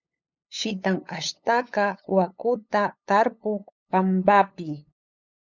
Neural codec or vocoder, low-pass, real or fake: codec, 16 kHz, 8 kbps, FunCodec, trained on LibriTTS, 25 frames a second; 7.2 kHz; fake